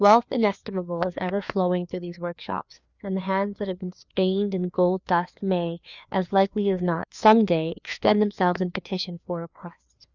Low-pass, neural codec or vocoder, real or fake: 7.2 kHz; codec, 16 kHz, 2 kbps, FreqCodec, larger model; fake